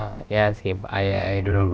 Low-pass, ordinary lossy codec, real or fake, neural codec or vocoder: none; none; fake; codec, 16 kHz, about 1 kbps, DyCAST, with the encoder's durations